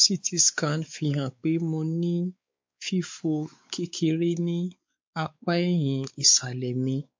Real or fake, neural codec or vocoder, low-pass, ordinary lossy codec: fake; codec, 16 kHz, 4 kbps, X-Codec, WavLM features, trained on Multilingual LibriSpeech; 7.2 kHz; MP3, 48 kbps